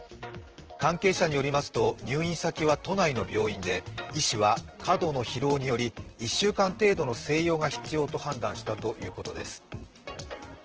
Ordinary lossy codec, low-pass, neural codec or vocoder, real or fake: Opus, 16 kbps; 7.2 kHz; vocoder, 44.1 kHz, 128 mel bands, Pupu-Vocoder; fake